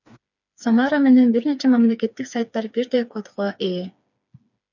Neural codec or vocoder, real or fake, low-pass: codec, 16 kHz, 4 kbps, FreqCodec, smaller model; fake; 7.2 kHz